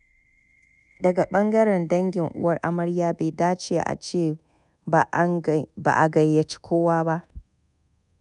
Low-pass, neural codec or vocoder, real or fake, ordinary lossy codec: 10.8 kHz; codec, 24 kHz, 1.2 kbps, DualCodec; fake; none